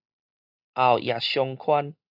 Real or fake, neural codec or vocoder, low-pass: real; none; 5.4 kHz